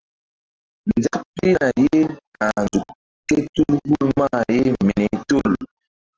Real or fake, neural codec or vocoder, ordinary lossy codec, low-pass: real; none; Opus, 16 kbps; 7.2 kHz